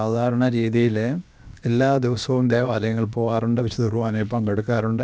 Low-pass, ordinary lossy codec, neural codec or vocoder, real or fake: none; none; codec, 16 kHz, about 1 kbps, DyCAST, with the encoder's durations; fake